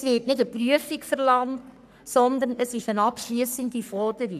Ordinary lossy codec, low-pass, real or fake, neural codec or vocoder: none; 14.4 kHz; fake; codec, 44.1 kHz, 3.4 kbps, Pupu-Codec